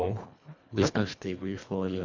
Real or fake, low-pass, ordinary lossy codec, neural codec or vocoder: fake; 7.2 kHz; MP3, 64 kbps; codec, 24 kHz, 1.5 kbps, HILCodec